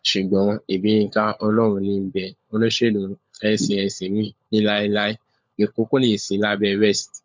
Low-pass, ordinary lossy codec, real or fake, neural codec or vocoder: 7.2 kHz; MP3, 64 kbps; fake; codec, 16 kHz, 16 kbps, FunCodec, trained on LibriTTS, 50 frames a second